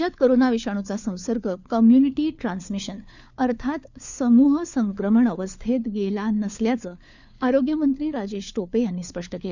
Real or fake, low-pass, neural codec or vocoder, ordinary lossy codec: fake; 7.2 kHz; codec, 16 kHz, 4 kbps, FunCodec, trained on LibriTTS, 50 frames a second; none